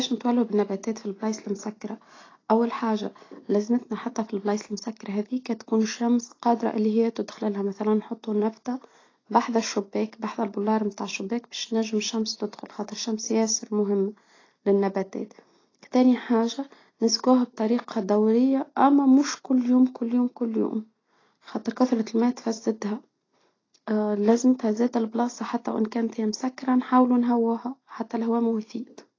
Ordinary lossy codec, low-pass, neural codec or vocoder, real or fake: AAC, 32 kbps; 7.2 kHz; none; real